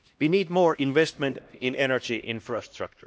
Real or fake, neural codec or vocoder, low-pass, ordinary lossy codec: fake; codec, 16 kHz, 1 kbps, X-Codec, HuBERT features, trained on LibriSpeech; none; none